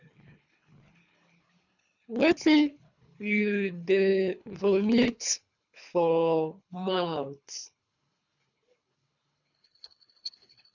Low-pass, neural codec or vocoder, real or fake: 7.2 kHz; codec, 24 kHz, 3 kbps, HILCodec; fake